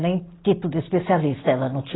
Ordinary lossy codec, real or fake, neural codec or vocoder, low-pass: AAC, 16 kbps; real; none; 7.2 kHz